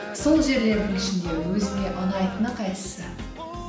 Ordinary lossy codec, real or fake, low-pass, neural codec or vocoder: none; real; none; none